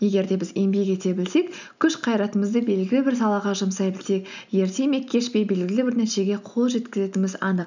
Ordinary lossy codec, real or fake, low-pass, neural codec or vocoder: none; real; 7.2 kHz; none